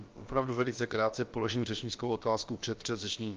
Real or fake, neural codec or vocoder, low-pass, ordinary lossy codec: fake; codec, 16 kHz, about 1 kbps, DyCAST, with the encoder's durations; 7.2 kHz; Opus, 32 kbps